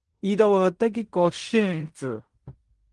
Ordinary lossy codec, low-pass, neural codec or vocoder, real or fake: Opus, 24 kbps; 10.8 kHz; codec, 16 kHz in and 24 kHz out, 0.4 kbps, LongCat-Audio-Codec, fine tuned four codebook decoder; fake